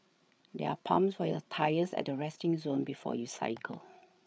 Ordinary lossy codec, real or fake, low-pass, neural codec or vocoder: none; fake; none; codec, 16 kHz, 8 kbps, FreqCodec, larger model